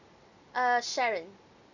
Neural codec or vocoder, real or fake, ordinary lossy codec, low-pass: none; real; none; 7.2 kHz